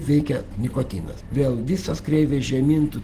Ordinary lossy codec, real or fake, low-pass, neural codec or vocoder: Opus, 16 kbps; real; 14.4 kHz; none